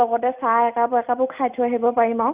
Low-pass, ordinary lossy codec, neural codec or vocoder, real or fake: 3.6 kHz; Opus, 64 kbps; none; real